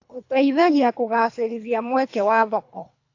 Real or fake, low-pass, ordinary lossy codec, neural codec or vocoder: fake; 7.2 kHz; AAC, 48 kbps; codec, 24 kHz, 3 kbps, HILCodec